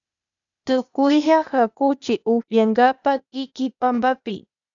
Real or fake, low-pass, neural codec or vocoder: fake; 7.2 kHz; codec, 16 kHz, 0.8 kbps, ZipCodec